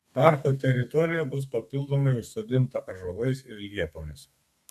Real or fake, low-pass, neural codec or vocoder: fake; 14.4 kHz; codec, 32 kHz, 1.9 kbps, SNAC